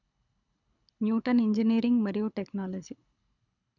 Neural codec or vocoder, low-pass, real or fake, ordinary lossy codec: codec, 24 kHz, 6 kbps, HILCodec; 7.2 kHz; fake; none